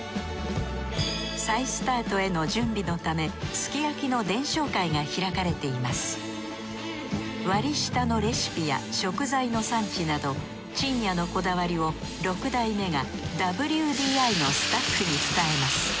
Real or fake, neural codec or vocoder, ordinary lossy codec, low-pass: real; none; none; none